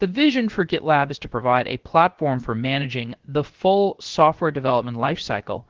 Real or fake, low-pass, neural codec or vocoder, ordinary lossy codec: fake; 7.2 kHz; codec, 16 kHz, about 1 kbps, DyCAST, with the encoder's durations; Opus, 16 kbps